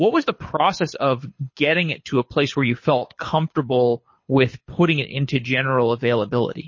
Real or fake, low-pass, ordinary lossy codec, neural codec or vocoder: fake; 7.2 kHz; MP3, 32 kbps; codec, 24 kHz, 6 kbps, HILCodec